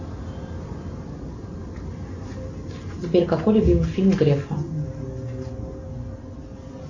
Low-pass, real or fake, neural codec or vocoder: 7.2 kHz; real; none